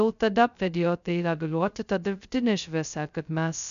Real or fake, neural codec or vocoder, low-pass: fake; codec, 16 kHz, 0.2 kbps, FocalCodec; 7.2 kHz